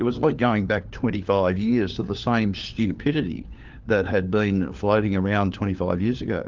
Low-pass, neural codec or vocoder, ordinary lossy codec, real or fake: 7.2 kHz; codec, 16 kHz, 4 kbps, FunCodec, trained on LibriTTS, 50 frames a second; Opus, 32 kbps; fake